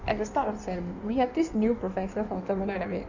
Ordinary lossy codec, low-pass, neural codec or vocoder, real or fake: none; 7.2 kHz; codec, 16 kHz in and 24 kHz out, 1.1 kbps, FireRedTTS-2 codec; fake